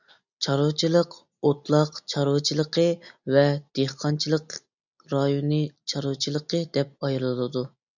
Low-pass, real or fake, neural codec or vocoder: 7.2 kHz; real; none